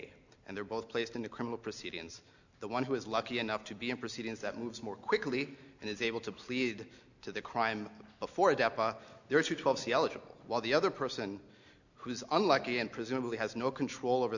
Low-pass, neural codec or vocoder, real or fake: 7.2 kHz; none; real